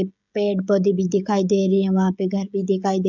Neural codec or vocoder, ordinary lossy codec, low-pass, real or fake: codec, 16 kHz, 16 kbps, FreqCodec, smaller model; none; 7.2 kHz; fake